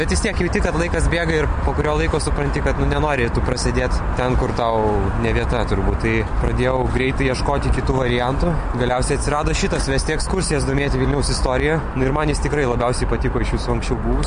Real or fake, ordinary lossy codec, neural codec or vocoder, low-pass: real; MP3, 48 kbps; none; 9.9 kHz